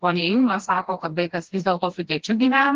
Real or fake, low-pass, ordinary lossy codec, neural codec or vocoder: fake; 7.2 kHz; Opus, 16 kbps; codec, 16 kHz, 1 kbps, FreqCodec, smaller model